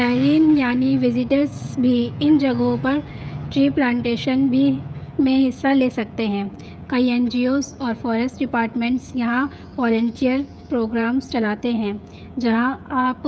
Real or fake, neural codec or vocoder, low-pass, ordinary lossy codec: fake; codec, 16 kHz, 16 kbps, FreqCodec, smaller model; none; none